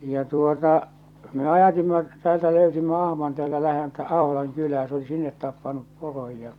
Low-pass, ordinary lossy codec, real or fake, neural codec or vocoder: 19.8 kHz; none; fake; vocoder, 44.1 kHz, 128 mel bands every 256 samples, BigVGAN v2